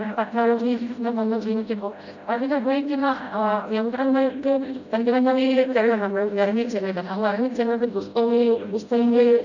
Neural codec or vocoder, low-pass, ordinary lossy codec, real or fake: codec, 16 kHz, 0.5 kbps, FreqCodec, smaller model; 7.2 kHz; none; fake